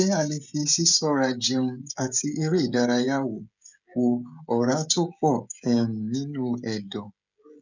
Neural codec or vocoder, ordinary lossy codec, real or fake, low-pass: codec, 16 kHz, 16 kbps, FreqCodec, smaller model; none; fake; 7.2 kHz